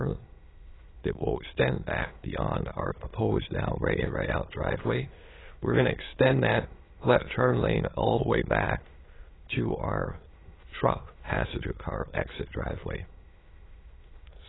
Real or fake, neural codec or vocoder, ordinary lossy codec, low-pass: fake; autoencoder, 22.05 kHz, a latent of 192 numbers a frame, VITS, trained on many speakers; AAC, 16 kbps; 7.2 kHz